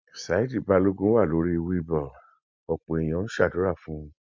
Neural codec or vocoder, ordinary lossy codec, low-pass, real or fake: none; MP3, 64 kbps; 7.2 kHz; real